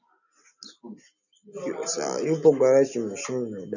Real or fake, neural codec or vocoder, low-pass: real; none; 7.2 kHz